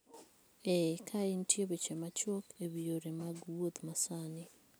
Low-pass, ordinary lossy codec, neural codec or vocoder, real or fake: none; none; none; real